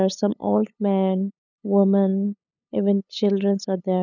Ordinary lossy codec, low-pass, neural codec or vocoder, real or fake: none; 7.2 kHz; codec, 16 kHz, 8 kbps, FunCodec, trained on LibriTTS, 25 frames a second; fake